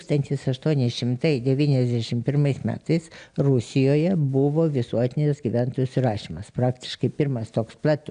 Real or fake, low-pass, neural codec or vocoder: real; 9.9 kHz; none